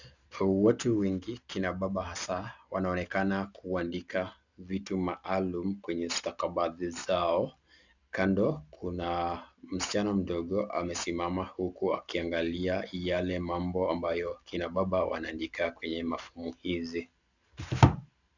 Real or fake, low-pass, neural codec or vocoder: real; 7.2 kHz; none